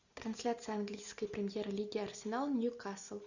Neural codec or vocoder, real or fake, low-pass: none; real; 7.2 kHz